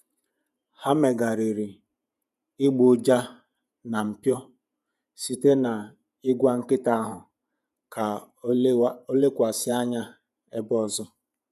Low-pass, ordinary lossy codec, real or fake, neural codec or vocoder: 14.4 kHz; none; fake; vocoder, 48 kHz, 128 mel bands, Vocos